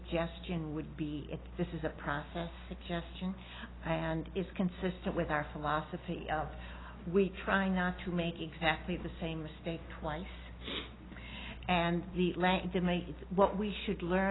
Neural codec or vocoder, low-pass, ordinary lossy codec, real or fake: none; 7.2 kHz; AAC, 16 kbps; real